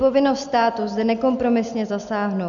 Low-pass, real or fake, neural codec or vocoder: 7.2 kHz; real; none